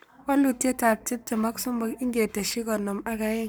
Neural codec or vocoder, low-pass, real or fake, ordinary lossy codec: codec, 44.1 kHz, 7.8 kbps, Pupu-Codec; none; fake; none